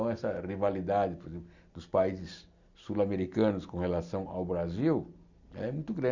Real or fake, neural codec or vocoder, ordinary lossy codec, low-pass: real; none; Opus, 64 kbps; 7.2 kHz